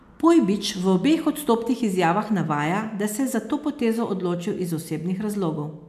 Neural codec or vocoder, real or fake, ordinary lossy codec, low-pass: none; real; AAC, 96 kbps; 14.4 kHz